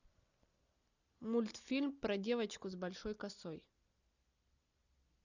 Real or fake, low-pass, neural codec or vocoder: real; 7.2 kHz; none